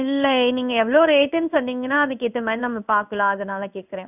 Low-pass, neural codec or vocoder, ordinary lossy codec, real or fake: 3.6 kHz; codec, 16 kHz in and 24 kHz out, 1 kbps, XY-Tokenizer; none; fake